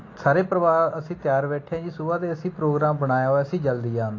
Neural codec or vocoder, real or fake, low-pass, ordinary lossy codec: none; real; 7.2 kHz; AAC, 32 kbps